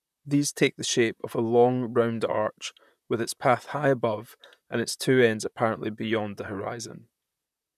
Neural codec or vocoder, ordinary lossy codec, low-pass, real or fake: vocoder, 44.1 kHz, 128 mel bands, Pupu-Vocoder; none; 14.4 kHz; fake